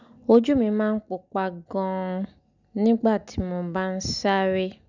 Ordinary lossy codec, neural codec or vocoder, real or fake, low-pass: none; none; real; 7.2 kHz